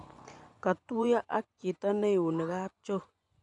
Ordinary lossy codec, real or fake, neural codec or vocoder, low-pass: none; fake; vocoder, 24 kHz, 100 mel bands, Vocos; 10.8 kHz